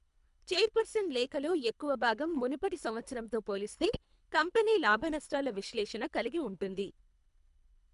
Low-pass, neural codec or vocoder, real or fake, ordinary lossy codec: 10.8 kHz; codec, 24 kHz, 3 kbps, HILCodec; fake; MP3, 96 kbps